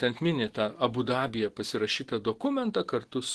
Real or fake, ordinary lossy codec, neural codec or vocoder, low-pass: real; Opus, 16 kbps; none; 10.8 kHz